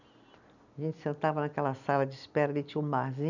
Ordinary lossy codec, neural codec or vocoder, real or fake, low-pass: none; none; real; 7.2 kHz